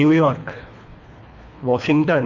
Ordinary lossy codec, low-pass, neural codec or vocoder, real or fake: Opus, 64 kbps; 7.2 kHz; codec, 24 kHz, 3 kbps, HILCodec; fake